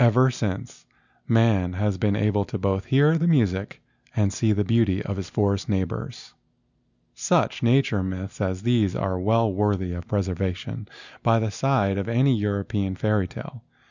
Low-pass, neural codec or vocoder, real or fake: 7.2 kHz; none; real